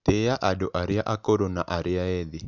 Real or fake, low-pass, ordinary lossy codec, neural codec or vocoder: real; 7.2 kHz; none; none